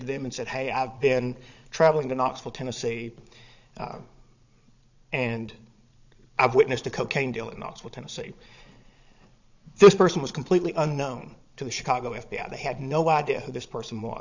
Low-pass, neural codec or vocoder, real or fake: 7.2 kHz; vocoder, 22.05 kHz, 80 mel bands, Vocos; fake